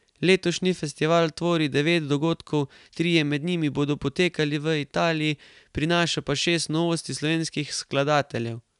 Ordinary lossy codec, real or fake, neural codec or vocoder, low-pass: none; real; none; 10.8 kHz